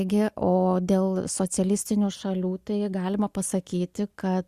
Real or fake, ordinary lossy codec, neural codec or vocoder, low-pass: real; Opus, 64 kbps; none; 14.4 kHz